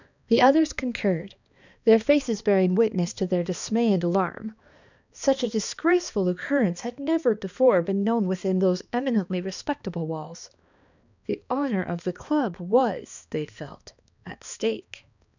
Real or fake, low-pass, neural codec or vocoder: fake; 7.2 kHz; codec, 16 kHz, 2 kbps, X-Codec, HuBERT features, trained on balanced general audio